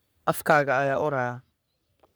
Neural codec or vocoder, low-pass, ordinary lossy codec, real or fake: codec, 44.1 kHz, 3.4 kbps, Pupu-Codec; none; none; fake